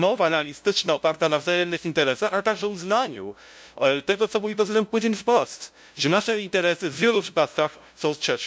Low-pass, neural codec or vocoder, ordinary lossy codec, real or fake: none; codec, 16 kHz, 0.5 kbps, FunCodec, trained on LibriTTS, 25 frames a second; none; fake